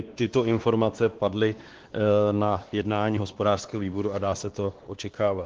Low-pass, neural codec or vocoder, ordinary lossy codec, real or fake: 7.2 kHz; codec, 16 kHz, 2 kbps, X-Codec, WavLM features, trained on Multilingual LibriSpeech; Opus, 16 kbps; fake